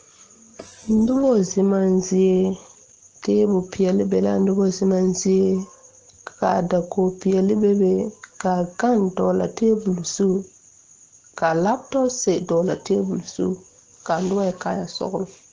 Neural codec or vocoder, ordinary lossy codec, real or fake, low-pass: none; Opus, 16 kbps; real; 7.2 kHz